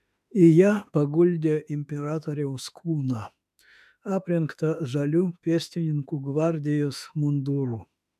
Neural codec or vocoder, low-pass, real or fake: autoencoder, 48 kHz, 32 numbers a frame, DAC-VAE, trained on Japanese speech; 14.4 kHz; fake